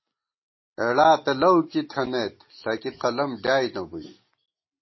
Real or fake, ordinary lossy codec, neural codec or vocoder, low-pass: real; MP3, 24 kbps; none; 7.2 kHz